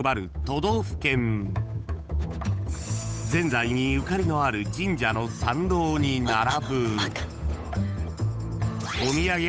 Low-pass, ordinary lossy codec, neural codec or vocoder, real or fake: none; none; codec, 16 kHz, 8 kbps, FunCodec, trained on Chinese and English, 25 frames a second; fake